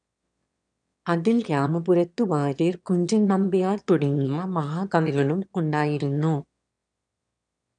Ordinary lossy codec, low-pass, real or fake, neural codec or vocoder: none; 9.9 kHz; fake; autoencoder, 22.05 kHz, a latent of 192 numbers a frame, VITS, trained on one speaker